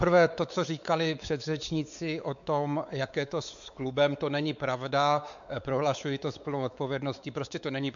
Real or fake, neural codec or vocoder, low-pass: fake; codec, 16 kHz, 4 kbps, X-Codec, WavLM features, trained on Multilingual LibriSpeech; 7.2 kHz